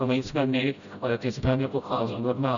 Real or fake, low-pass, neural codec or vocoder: fake; 7.2 kHz; codec, 16 kHz, 0.5 kbps, FreqCodec, smaller model